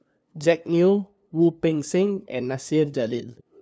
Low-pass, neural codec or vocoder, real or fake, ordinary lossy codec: none; codec, 16 kHz, 2 kbps, FunCodec, trained on LibriTTS, 25 frames a second; fake; none